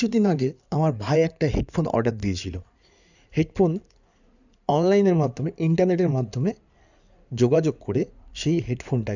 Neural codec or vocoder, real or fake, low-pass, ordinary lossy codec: codec, 16 kHz in and 24 kHz out, 2.2 kbps, FireRedTTS-2 codec; fake; 7.2 kHz; none